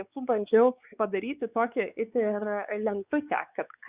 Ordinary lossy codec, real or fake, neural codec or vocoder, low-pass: Opus, 64 kbps; fake; codec, 16 kHz, 4 kbps, X-Codec, WavLM features, trained on Multilingual LibriSpeech; 3.6 kHz